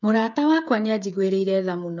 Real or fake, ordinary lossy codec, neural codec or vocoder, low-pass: fake; none; codec, 16 kHz, 8 kbps, FreqCodec, smaller model; 7.2 kHz